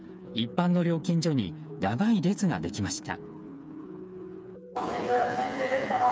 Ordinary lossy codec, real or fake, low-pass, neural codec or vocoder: none; fake; none; codec, 16 kHz, 4 kbps, FreqCodec, smaller model